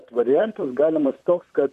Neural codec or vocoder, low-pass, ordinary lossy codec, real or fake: vocoder, 44.1 kHz, 128 mel bands, Pupu-Vocoder; 14.4 kHz; AAC, 96 kbps; fake